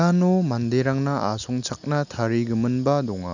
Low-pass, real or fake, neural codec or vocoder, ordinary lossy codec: 7.2 kHz; real; none; none